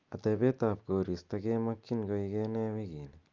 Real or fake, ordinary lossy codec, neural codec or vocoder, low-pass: real; Opus, 24 kbps; none; 7.2 kHz